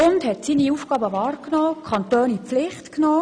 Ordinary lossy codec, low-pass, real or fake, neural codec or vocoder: none; 9.9 kHz; real; none